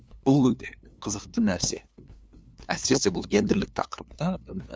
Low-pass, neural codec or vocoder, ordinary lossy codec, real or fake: none; codec, 16 kHz, 2 kbps, FunCodec, trained on LibriTTS, 25 frames a second; none; fake